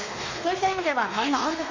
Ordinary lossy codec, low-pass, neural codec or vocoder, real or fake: MP3, 32 kbps; 7.2 kHz; codec, 16 kHz, 2 kbps, X-Codec, WavLM features, trained on Multilingual LibriSpeech; fake